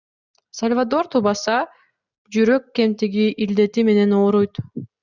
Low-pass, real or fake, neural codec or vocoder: 7.2 kHz; real; none